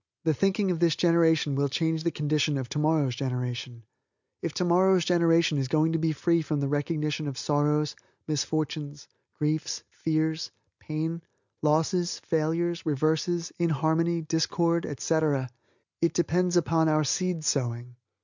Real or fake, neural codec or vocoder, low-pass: real; none; 7.2 kHz